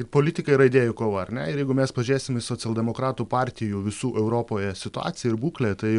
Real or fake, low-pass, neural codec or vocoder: real; 10.8 kHz; none